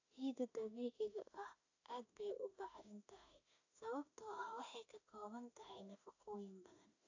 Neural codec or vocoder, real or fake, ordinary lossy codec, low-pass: autoencoder, 48 kHz, 32 numbers a frame, DAC-VAE, trained on Japanese speech; fake; AAC, 48 kbps; 7.2 kHz